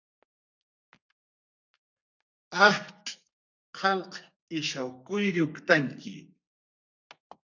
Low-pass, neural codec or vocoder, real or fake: 7.2 kHz; codec, 32 kHz, 1.9 kbps, SNAC; fake